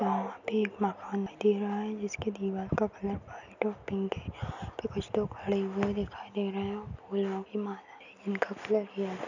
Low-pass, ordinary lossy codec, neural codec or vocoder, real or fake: 7.2 kHz; none; none; real